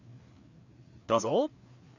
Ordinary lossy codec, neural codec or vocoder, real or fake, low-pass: none; codec, 16 kHz, 4 kbps, FreqCodec, larger model; fake; 7.2 kHz